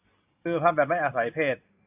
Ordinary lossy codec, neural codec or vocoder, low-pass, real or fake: AAC, 32 kbps; vocoder, 44.1 kHz, 128 mel bands every 512 samples, BigVGAN v2; 3.6 kHz; fake